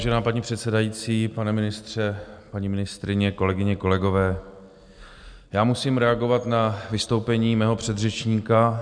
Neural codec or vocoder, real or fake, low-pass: none; real; 9.9 kHz